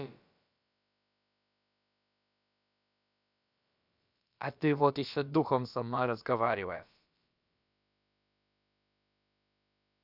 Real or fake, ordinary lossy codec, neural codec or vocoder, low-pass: fake; none; codec, 16 kHz, about 1 kbps, DyCAST, with the encoder's durations; 5.4 kHz